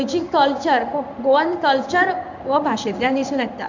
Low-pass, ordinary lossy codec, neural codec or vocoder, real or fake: 7.2 kHz; none; codec, 16 kHz in and 24 kHz out, 1 kbps, XY-Tokenizer; fake